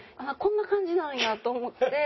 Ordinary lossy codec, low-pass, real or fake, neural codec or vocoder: MP3, 24 kbps; 7.2 kHz; real; none